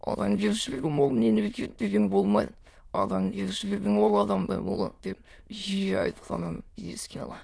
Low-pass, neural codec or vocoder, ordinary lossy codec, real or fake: none; autoencoder, 22.05 kHz, a latent of 192 numbers a frame, VITS, trained on many speakers; none; fake